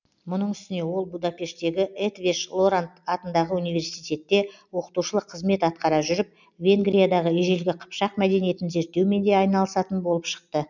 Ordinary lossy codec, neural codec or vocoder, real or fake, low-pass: none; none; real; 7.2 kHz